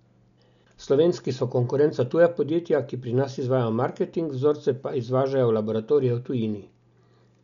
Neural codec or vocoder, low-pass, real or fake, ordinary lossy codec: none; 7.2 kHz; real; none